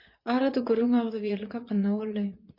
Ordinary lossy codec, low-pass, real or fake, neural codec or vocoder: MP3, 32 kbps; 5.4 kHz; fake; vocoder, 22.05 kHz, 80 mel bands, Vocos